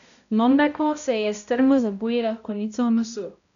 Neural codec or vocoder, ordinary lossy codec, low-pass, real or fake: codec, 16 kHz, 0.5 kbps, X-Codec, HuBERT features, trained on balanced general audio; none; 7.2 kHz; fake